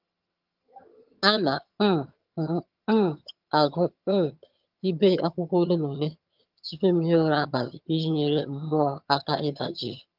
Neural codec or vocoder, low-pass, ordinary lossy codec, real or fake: vocoder, 22.05 kHz, 80 mel bands, HiFi-GAN; 5.4 kHz; Opus, 32 kbps; fake